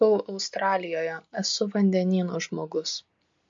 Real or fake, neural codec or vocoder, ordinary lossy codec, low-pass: real; none; MP3, 48 kbps; 7.2 kHz